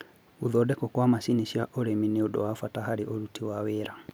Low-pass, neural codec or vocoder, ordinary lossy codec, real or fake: none; none; none; real